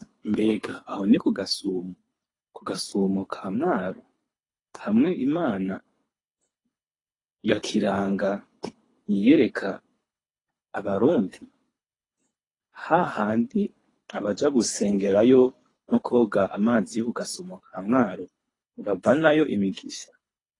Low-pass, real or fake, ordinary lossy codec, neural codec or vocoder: 10.8 kHz; fake; AAC, 32 kbps; codec, 24 kHz, 3 kbps, HILCodec